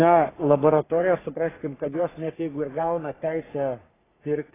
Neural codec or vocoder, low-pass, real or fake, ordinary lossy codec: codec, 44.1 kHz, 3.4 kbps, Pupu-Codec; 3.6 kHz; fake; AAC, 16 kbps